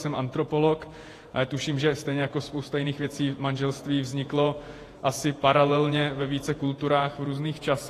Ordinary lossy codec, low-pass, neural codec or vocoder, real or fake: AAC, 48 kbps; 14.4 kHz; vocoder, 48 kHz, 128 mel bands, Vocos; fake